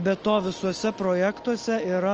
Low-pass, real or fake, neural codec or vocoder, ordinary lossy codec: 7.2 kHz; real; none; Opus, 24 kbps